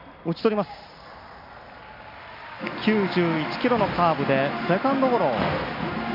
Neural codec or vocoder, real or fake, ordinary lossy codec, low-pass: none; real; MP3, 48 kbps; 5.4 kHz